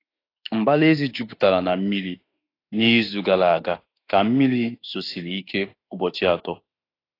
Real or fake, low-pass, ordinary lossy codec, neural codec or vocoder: fake; 5.4 kHz; AAC, 32 kbps; autoencoder, 48 kHz, 32 numbers a frame, DAC-VAE, trained on Japanese speech